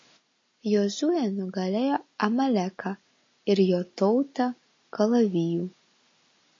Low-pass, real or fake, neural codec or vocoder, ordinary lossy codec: 7.2 kHz; real; none; MP3, 32 kbps